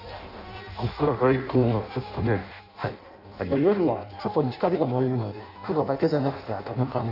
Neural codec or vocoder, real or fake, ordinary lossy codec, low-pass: codec, 16 kHz in and 24 kHz out, 0.6 kbps, FireRedTTS-2 codec; fake; none; 5.4 kHz